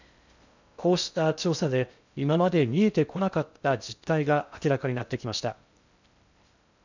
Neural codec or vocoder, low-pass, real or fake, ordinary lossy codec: codec, 16 kHz in and 24 kHz out, 0.6 kbps, FocalCodec, streaming, 2048 codes; 7.2 kHz; fake; none